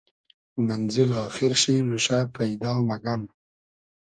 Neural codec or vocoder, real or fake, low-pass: codec, 44.1 kHz, 2.6 kbps, DAC; fake; 9.9 kHz